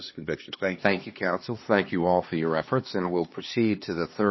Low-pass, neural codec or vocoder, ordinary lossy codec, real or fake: 7.2 kHz; codec, 16 kHz, 2 kbps, X-Codec, HuBERT features, trained on LibriSpeech; MP3, 24 kbps; fake